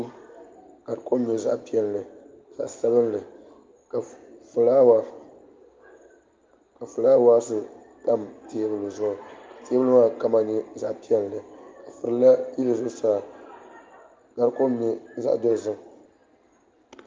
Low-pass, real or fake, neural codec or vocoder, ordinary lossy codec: 7.2 kHz; real; none; Opus, 32 kbps